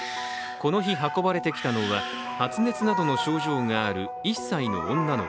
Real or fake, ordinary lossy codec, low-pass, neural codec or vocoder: real; none; none; none